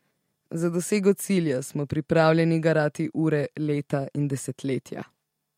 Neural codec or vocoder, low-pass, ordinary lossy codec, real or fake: none; 19.8 kHz; MP3, 64 kbps; real